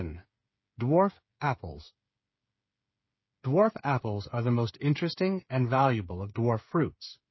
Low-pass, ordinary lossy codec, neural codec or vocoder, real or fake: 7.2 kHz; MP3, 24 kbps; codec, 16 kHz, 8 kbps, FreqCodec, smaller model; fake